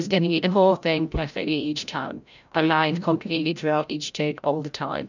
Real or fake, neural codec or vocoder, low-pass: fake; codec, 16 kHz, 0.5 kbps, FreqCodec, larger model; 7.2 kHz